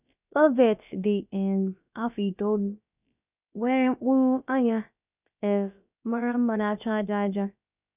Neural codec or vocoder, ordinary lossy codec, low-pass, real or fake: codec, 16 kHz, about 1 kbps, DyCAST, with the encoder's durations; none; 3.6 kHz; fake